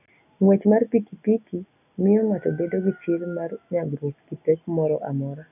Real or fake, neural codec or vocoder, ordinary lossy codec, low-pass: real; none; none; 3.6 kHz